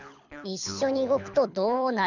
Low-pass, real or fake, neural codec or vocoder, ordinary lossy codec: 7.2 kHz; fake; codec, 24 kHz, 6 kbps, HILCodec; none